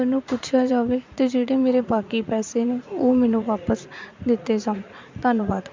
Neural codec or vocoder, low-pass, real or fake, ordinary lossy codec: vocoder, 44.1 kHz, 80 mel bands, Vocos; 7.2 kHz; fake; MP3, 64 kbps